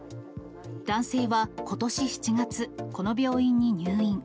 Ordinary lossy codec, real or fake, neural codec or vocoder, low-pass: none; real; none; none